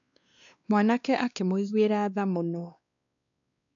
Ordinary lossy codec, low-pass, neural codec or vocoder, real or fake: none; 7.2 kHz; codec, 16 kHz, 2 kbps, X-Codec, WavLM features, trained on Multilingual LibriSpeech; fake